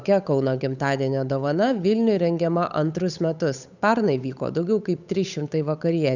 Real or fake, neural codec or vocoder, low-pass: fake; codec, 16 kHz, 8 kbps, FunCodec, trained on Chinese and English, 25 frames a second; 7.2 kHz